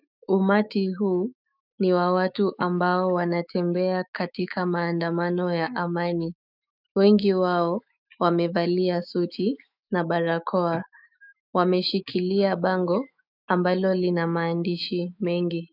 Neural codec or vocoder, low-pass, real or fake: autoencoder, 48 kHz, 128 numbers a frame, DAC-VAE, trained on Japanese speech; 5.4 kHz; fake